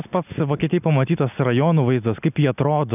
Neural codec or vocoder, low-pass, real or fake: none; 3.6 kHz; real